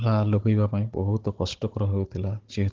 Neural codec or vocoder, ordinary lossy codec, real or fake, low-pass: codec, 16 kHz, 16 kbps, FunCodec, trained on Chinese and English, 50 frames a second; Opus, 16 kbps; fake; 7.2 kHz